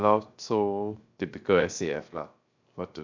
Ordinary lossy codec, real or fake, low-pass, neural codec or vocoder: MP3, 64 kbps; fake; 7.2 kHz; codec, 16 kHz, 0.7 kbps, FocalCodec